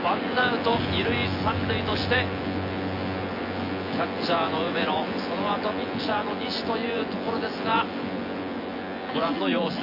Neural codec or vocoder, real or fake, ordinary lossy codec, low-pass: vocoder, 24 kHz, 100 mel bands, Vocos; fake; MP3, 32 kbps; 5.4 kHz